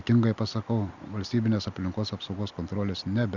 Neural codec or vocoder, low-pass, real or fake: none; 7.2 kHz; real